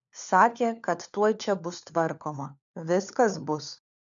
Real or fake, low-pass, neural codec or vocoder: fake; 7.2 kHz; codec, 16 kHz, 4 kbps, FunCodec, trained on LibriTTS, 50 frames a second